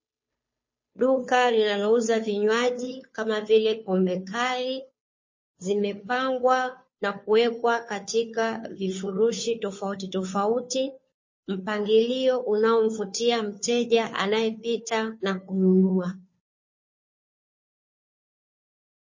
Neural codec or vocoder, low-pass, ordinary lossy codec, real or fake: codec, 16 kHz, 2 kbps, FunCodec, trained on Chinese and English, 25 frames a second; 7.2 kHz; MP3, 32 kbps; fake